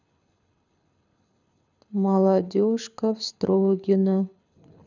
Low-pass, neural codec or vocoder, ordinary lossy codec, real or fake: 7.2 kHz; codec, 24 kHz, 6 kbps, HILCodec; none; fake